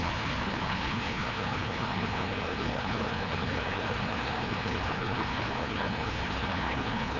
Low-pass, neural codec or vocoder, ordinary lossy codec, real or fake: 7.2 kHz; codec, 16 kHz, 2 kbps, FreqCodec, larger model; none; fake